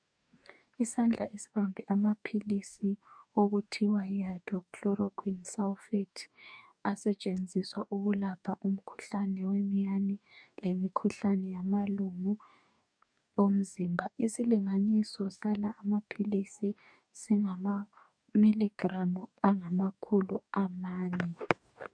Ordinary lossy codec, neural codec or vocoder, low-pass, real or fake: MP3, 96 kbps; codec, 44.1 kHz, 2.6 kbps, DAC; 9.9 kHz; fake